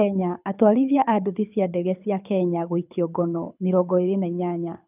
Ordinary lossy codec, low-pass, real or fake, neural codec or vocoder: none; 3.6 kHz; fake; codec, 24 kHz, 6 kbps, HILCodec